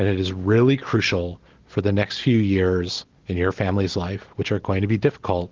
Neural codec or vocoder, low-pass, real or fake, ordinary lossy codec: none; 7.2 kHz; real; Opus, 16 kbps